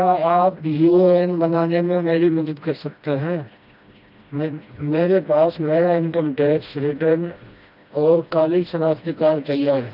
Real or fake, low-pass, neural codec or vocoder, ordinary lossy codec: fake; 5.4 kHz; codec, 16 kHz, 1 kbps, FreqCodec, smaller model; none